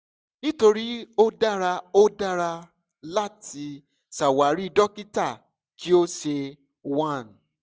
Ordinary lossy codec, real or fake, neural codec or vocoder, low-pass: none; real; none; none